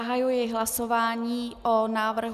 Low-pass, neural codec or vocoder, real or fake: 14.4 kHz; none; real